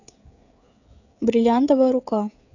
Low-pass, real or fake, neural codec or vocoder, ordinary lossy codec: 7.2 kHz; fake; codec, 16 kHz, 16 kbps, FreqCodec, smaller model; none